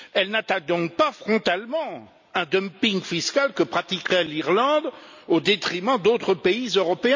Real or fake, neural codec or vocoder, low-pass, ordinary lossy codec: real; none; 7.2 kHz; MP3, 64 kbps